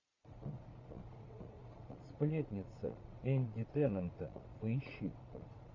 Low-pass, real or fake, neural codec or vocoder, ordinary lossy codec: 7.2 kHz; fake; vocoder, 22.05 kHz, 80 mel bands, Vocos; AAC, 48 kbps